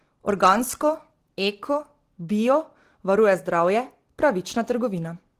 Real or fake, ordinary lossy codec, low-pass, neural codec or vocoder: real; Opus, 16 kbps; 14.4 kHz; none